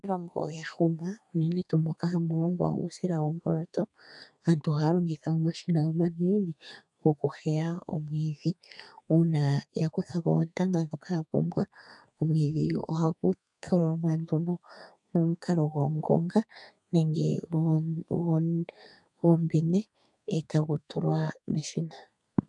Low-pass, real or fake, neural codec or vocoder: 10.8 kHz; fake; codec, 32 kHz, 1.9 kbps, SNAC